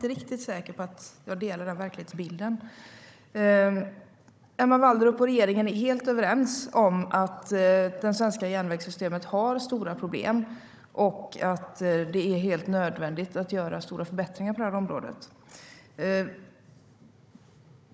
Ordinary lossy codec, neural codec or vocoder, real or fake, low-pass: none; codec, 16 kHz, 16 kbps, FunCodec, trained on Chinese and English, 50 frames a second; fake; none